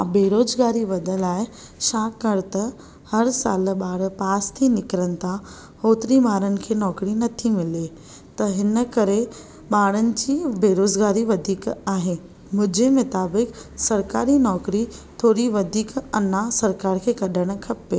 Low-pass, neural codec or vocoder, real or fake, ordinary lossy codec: none; none; real; none